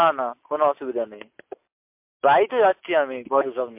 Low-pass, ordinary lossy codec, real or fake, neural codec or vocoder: 3.6 kHz; MP3, 32 kbps; real; none